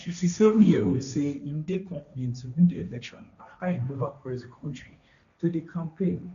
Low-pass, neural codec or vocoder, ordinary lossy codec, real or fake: 7.2 kHz; codec, 16 kHz, 1.1 kbps, Voila-Tokenizer; none; fake